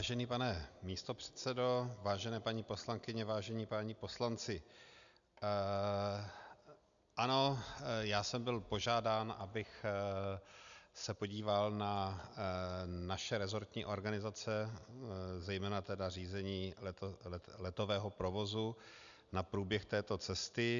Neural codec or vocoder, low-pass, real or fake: none; 7.2 kHz; real